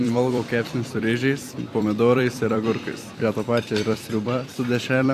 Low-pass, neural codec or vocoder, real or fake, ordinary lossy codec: 14.4 kHz; vocoder, 44.1 kHz, 128 mel bands every 256 samples, BigVGAN v2; fake; AAC, 64 kbps